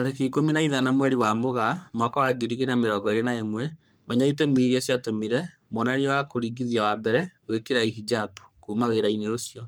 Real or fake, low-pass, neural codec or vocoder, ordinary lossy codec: fake; none; codec, 44.1 kHz, 3.4 kbps, Pupu-Codec; none